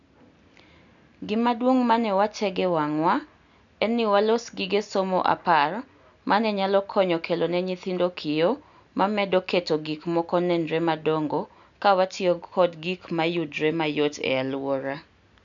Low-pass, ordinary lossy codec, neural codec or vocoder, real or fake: 7.2 kHz; none; none; real